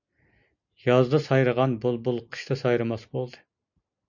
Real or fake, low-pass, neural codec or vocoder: real; 7.2 kHz; none